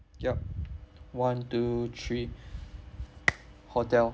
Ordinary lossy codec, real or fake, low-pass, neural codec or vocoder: none; real; none; none